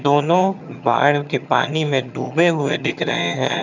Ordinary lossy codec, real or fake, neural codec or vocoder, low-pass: none; fake; vocoder, 22.05 kHz, 80 mel bands, HiFi-GAN; 7.2 kHz